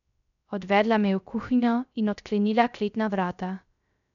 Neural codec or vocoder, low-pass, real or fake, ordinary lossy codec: codec, 16 kHz, 0.3 kbps, FocalCodec; 7.2 kHz; fake; none